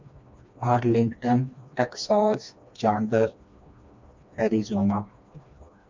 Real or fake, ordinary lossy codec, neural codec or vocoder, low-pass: fake; MP3, 64 kbps; codec, 16 kHz, 2 kbps, FreqCodec, smaller model; 7.2 kHz